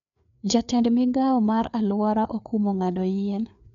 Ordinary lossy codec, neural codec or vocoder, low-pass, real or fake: none; codec, 16 kHz, 4 kbps, FreqCodec, larger model; 7.2 kHz; fake